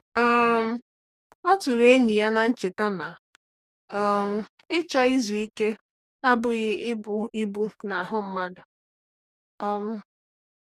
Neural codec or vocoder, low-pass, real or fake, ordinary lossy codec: codec, 44.1 kHz, 2.6 kbps, DAC; 14.4 kHz; fake; none